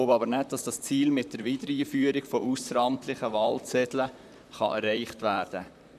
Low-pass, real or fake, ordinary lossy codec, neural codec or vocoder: 14.4 kHz; fake; none; vocoder, 44.1 kHz, 128 mel bands, Pupu-Vocoder